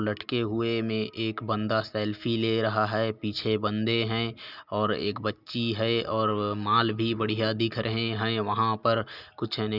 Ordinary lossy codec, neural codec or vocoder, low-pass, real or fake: none; none; 5.4 kHz; real